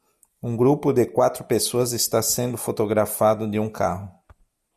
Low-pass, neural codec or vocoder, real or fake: 14.4 kHz; none; real